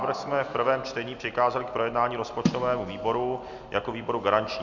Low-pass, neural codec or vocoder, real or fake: 7.2 kHz; none; real